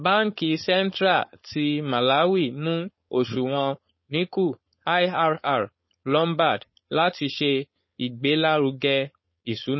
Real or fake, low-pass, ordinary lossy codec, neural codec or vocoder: fake; 7.2 kHz; MP3, 24 kbps; codec, 16 kHz, 4.8 kbps, FACodec